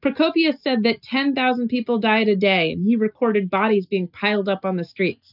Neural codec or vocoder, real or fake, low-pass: none; real; 5.4 kHz